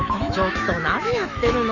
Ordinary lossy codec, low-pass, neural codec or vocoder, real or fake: none; 7.2 kHz; autoencoder, 48 kHz, 128 numbers a frame, DAC-VAE, trained on Japanese speech; fake